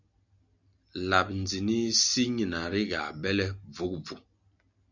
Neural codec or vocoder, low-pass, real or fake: none; 7.2 kHz; real